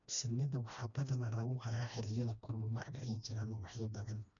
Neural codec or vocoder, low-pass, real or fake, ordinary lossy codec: codec, 16 kHz, 1 kbps, FreqCodec, smaller model; 7.2 kHz; fake; none